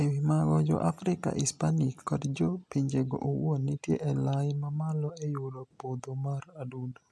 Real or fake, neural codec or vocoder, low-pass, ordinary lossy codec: real; none; none; none